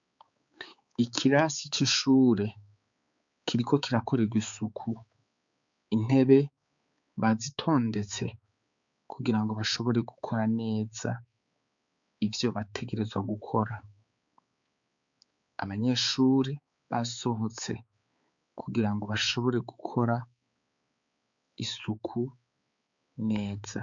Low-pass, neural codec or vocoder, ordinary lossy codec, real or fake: 7.2 kHz; codec, 16 kHz, 4 kbps, X-Codec, HuBERT features, trained on balanced general audio; MP3, 64 kbps; fake